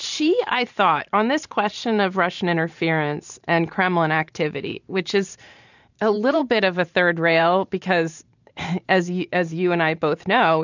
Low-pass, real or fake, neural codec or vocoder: 7.2 kHz; fake; vocoder, 44.1 kHz, 128 mel bands every 512 samples, BigVGAN v2